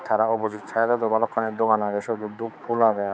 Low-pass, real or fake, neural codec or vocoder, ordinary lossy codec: none; fake; codec, 16 kHz, 4 kbps, X-Codec, HuBERT features, trained on balanced general audio; none